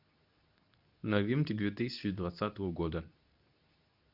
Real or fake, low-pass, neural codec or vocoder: fake; 5.4 kHz; codec, 24 kHz, 0.9 kbps, WavTokenizer, medium speech release version 2